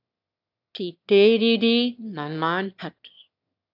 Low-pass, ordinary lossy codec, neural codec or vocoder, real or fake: 5.4 kHz; AAC, 48 kbps; autoencoder, 22.05 kHz, a latent of 192 numbers a frame, VITS, trained on one speaker; fake